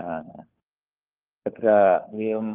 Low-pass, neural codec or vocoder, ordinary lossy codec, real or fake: 3.6 kHz; codec, 16 kHz, 4 kbps, FunCodec, trained on LibriTTS, 50 frames a second; Opus, 32 kbps; fake